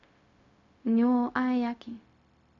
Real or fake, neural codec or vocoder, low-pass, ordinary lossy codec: fake; codec, 16 kHz, 0.4 kbps, LongCat-Audio-Codec; 7.2 kHz; none